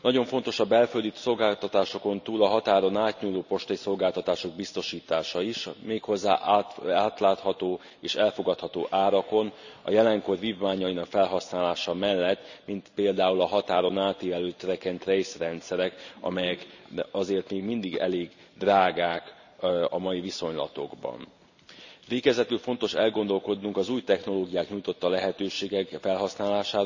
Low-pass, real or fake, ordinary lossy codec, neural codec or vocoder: 7.2 kHz; real; none; none